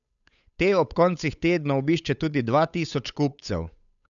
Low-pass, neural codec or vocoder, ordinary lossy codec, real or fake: 7.2 kHz; codec, 16 kHz, 8 kbps, FunCodec, trained on Chinese and English, 25 frames a second; none; fake